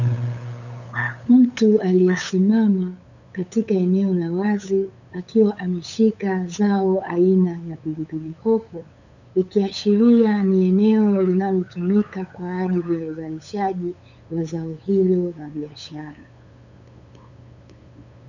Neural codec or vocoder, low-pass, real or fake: codec, 16 kHz, 8 kbps, FunCodec, trained on LibriTTS, 25 frames a second; 7.2 kHz; fake